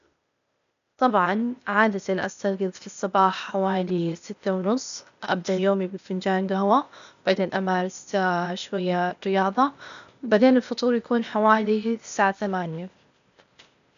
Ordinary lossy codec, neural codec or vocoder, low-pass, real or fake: none; codec, 16 kHz, 0.8 kbps, ZipCodec; 7.2 kHz; fake